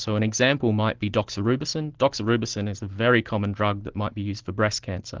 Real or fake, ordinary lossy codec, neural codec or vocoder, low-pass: real; Opus, 16 kbps; none; 7.2 kHz